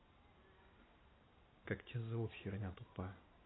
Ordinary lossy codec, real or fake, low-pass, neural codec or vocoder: AAC, 16 kbps; real; 7.2 kHz; none